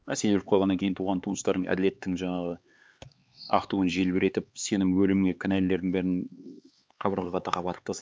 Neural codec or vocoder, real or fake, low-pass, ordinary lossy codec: codec, 16 kHz, 4 kbps, X-Codec, HuBERT features, trained on LibriSpeech; fake; none; none